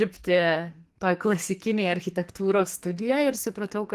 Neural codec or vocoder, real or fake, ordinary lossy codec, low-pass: codec, 44.1 kHz, 2.6 kbps, SNAC; fake; Opus, 24 kbps; 14.4 kHz